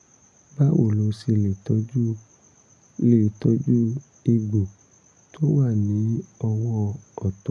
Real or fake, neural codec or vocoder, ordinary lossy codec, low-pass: real; none; none; none